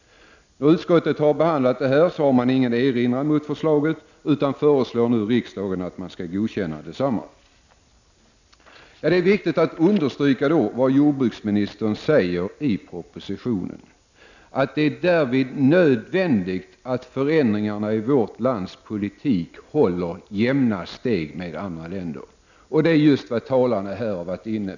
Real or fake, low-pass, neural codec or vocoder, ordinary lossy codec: real; 7.2 kHz; none; none